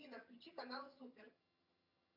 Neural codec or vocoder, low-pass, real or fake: vocoder, 22.05 kHz, 80 mel bands, HiFi-GAN; 5.4 kHz; fake